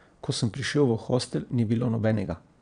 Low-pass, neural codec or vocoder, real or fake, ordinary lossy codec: 9.9 kHz; vocoder, 22.05 kHz, 80 mel bands, Vocos; fake; none